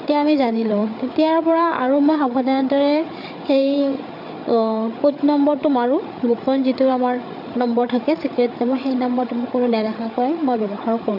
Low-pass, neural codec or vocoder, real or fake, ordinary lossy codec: 5.4 kHz; codec, 16 kHz, 8 kbps, FreqCodec, larger model; fake; none